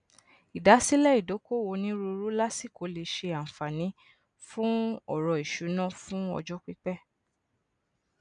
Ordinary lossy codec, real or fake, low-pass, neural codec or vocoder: none; real; 9.9 kHz; none